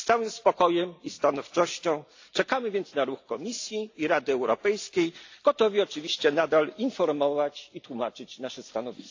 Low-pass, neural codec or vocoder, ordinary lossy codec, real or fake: 7.2 kHz; none; AAC, 48 kbps; real